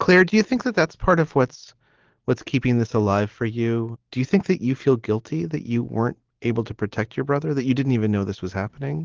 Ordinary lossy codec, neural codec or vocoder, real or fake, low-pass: Opus, 16 kbps; none; real; 7.2 kHz